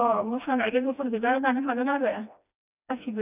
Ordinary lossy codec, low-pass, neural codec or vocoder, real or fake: none; 3.6 kHz; codec, 16 kHz, 1 kbps, FreqCodec, smaller model; fake